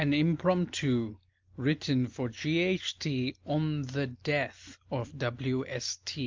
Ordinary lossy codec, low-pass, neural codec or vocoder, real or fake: Opus, 24 kbps; 7.2 kHz; none; real